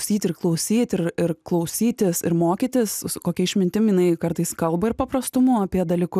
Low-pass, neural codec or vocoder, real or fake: 14.4 kHz; none; real